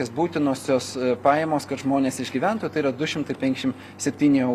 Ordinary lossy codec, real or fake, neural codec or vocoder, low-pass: Opus, 64 kbps; real; none; 14.4 kHz